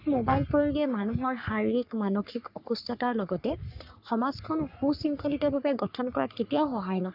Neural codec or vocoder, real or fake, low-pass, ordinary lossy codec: codec, 44.1 kHz, 3.4 kbps, Pupu-Codec; fake; 5.4 kHz; none